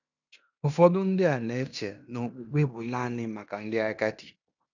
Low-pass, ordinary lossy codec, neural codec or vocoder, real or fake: 7.2 kHz; none; codec, 16 kHz in and 24 kHz out, 0.9 kbps, LongCat-Audio-Codec, fine tuned four codebook decoder; fake